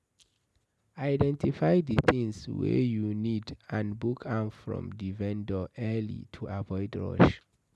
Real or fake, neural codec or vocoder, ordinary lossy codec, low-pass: real; none; none; none